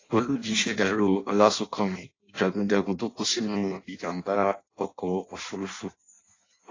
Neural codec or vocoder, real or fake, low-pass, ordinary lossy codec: codec, 16 kHz in and 24 kHz out, 0.6 kbps, FireRedTTS-2 codec; fake; 7.2 kHz; AAC, 32 kbps